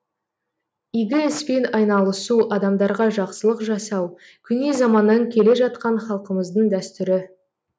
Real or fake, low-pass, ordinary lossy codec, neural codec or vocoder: real; none; none; none